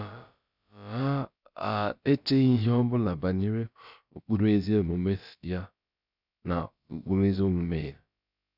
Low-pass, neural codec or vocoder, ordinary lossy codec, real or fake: 5.4 kHz; codec, 16 kHz, about 1 kbps, DyCAST, with the encoder's durations; none; fake